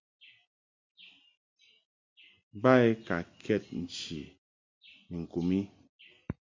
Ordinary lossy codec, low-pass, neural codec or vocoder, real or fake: MP3, 48 kbps; 7.2 kHz; none; real